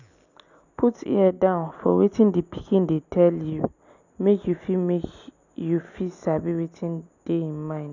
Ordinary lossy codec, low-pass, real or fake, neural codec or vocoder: none; 7.2 kHz; real; none